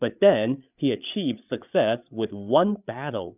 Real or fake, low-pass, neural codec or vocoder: fake; 3.6 kHz; codec, 16 kHz, 8 kbps, FreqCodec, larger model